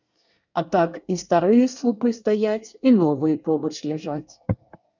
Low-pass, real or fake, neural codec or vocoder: 7.2 kHz; fake; codec, 24 kHz, 1 kbps, SNAC